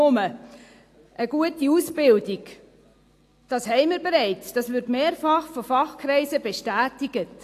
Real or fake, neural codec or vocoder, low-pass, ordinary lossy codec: real; none; 14.4 kHz; AAC, 64 kbps